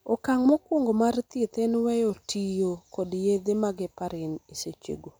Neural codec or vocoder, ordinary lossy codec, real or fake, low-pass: none; none; real; none